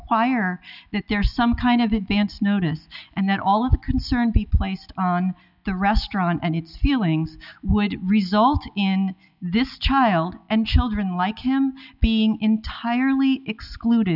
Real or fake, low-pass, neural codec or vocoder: real; 5.4 kHz; none